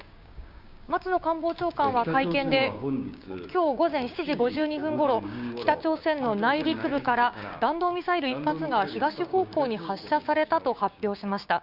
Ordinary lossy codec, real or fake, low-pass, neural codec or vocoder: none; fake; 5.4 kHz; codec, 16 kHz, 6 kbps, DAC